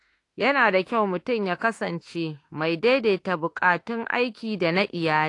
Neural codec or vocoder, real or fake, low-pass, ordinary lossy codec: autoencoder, 48 kHz, 32 numbers a frame, DAC-VAE, trained on Japanese speech; fake; 10.8 kHz; AAC, 48 kbps